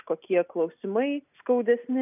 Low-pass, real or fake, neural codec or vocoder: 3.6 kHz; real; none